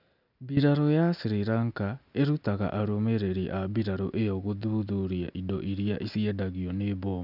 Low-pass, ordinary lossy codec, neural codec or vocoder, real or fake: 5.4 kHz; none; none; real